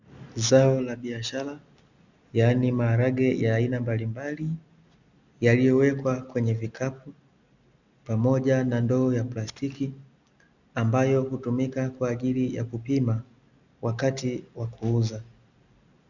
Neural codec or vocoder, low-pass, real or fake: none; 7.2 kHz; real